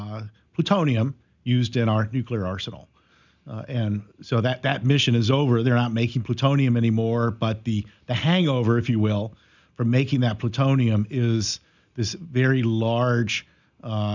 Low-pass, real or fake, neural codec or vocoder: 7.2 kHz; real; none